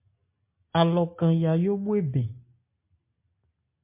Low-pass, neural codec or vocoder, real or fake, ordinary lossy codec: 3.6 kHz; none; real; MP3, 24 kbps